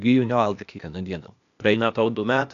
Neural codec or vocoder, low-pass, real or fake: codec, 16 kHz, 0.8 kbps, ZipCodec; 7.2 kHz; fake